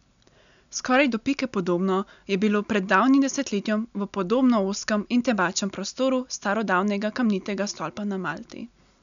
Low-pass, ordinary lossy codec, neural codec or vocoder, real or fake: 7.2 kHz; none; none; real